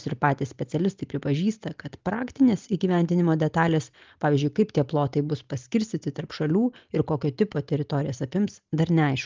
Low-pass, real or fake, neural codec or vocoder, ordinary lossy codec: 7.2 kHz; real; none; Opus, 32 kbps